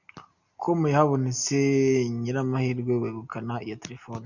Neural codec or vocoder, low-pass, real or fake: none; 7.2 kHz; real